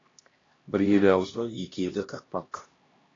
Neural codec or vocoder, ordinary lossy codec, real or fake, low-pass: codec, 16 kHz, 1 kbps, X-Codec, HuBERT features, trained on LibriSpeech; AAC, 32 kbps; fake; 7.2 kHz